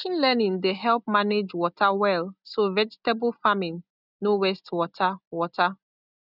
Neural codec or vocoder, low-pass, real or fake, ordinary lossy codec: none; 5.4 kHz; real; none